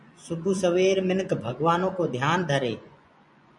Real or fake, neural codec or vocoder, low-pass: fake; vocoder, 24 kHz, 100 mel bands, Vocos; 10.8 kHz